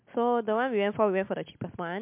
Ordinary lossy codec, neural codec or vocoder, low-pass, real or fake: MP3, 32 kbps; none; 3.6 kHz; real